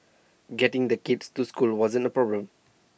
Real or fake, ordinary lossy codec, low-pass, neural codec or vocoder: real; none; none; none